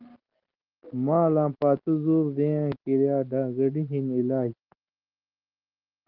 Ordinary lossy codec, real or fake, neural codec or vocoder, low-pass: Opus, 16 kbps; real; none; 5.4 kHz